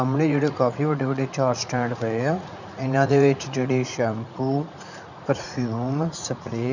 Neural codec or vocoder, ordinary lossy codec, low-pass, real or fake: vocoder, 44.1 kHz, 80 mel bands, Vocos; none; 7.2 kHz; fake